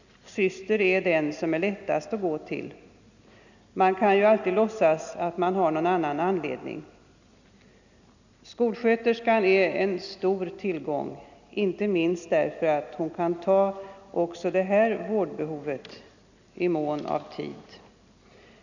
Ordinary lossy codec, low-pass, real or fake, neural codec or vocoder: none; 7.2 kHz; real; none